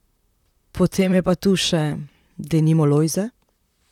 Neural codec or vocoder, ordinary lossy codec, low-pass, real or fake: vocoder, 44.1 kHz, 128 mel bands, Pupu-Vocoder; none; 19.8 kHz; fake